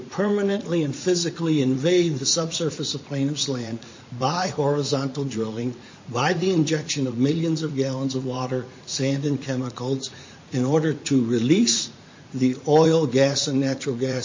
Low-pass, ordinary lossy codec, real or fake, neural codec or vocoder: 7.2 kHz; MP3, 32 kbps; fake; vocoder, 44.1 kHz, 80 mel bands, Vocos